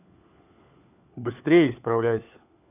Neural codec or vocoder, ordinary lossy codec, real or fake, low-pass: codec, 16 kHz, 4 kbps, FunCodec, trained on LibriTTS, 50 frames a second; none; fake; 3.6 kHz